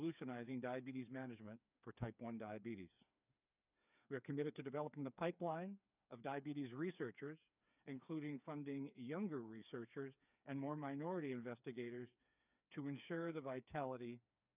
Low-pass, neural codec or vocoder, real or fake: 3.6 kHz; codec, 16 kHz, 4 kbps, FreqCodec, smaller model; fake